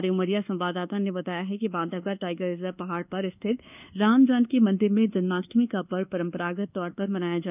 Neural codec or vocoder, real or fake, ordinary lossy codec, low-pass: codec, 24 kHz, 1.2 kbps, DualCodec; fake; none; 3.6 kHz